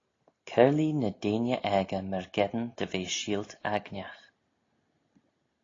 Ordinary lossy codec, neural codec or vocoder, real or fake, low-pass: AAC, 32 kbps; none; real; 7.2 kHz